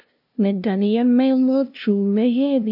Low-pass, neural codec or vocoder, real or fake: 5.4 kHz; codec, 16 kHz, 0.5 kbps, FunCodec, trained on LibriTTS, 25 frames a second; fake